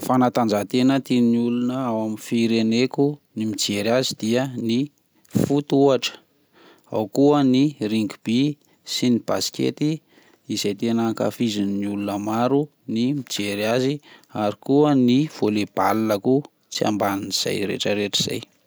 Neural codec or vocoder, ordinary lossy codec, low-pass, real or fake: none; none; none; real